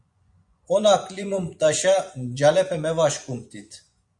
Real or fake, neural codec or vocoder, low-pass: fake; vocoder, 24 kHz, 100 mel bands, Vocos; 10.8 kHz